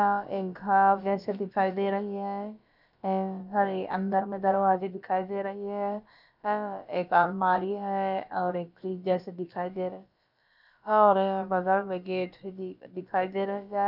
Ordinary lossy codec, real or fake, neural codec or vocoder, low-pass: none; fake; codec, 16 kHz, about 1 kbps, DyCAST, with the encoder's durations; 5.4 kHz